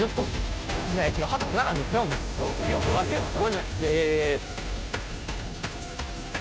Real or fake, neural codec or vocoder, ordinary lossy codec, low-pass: fake; codec, 16 kHz, 0.5 kbps, FunCodec, trained on Chinese and English, 25 frames a second; none; none